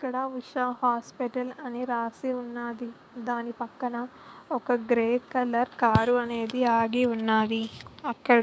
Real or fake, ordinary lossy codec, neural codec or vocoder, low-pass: fake; none; codec, 16 kHz, 6 kbps, DAC; none